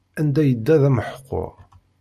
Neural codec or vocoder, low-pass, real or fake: none; 14.4 kHz; real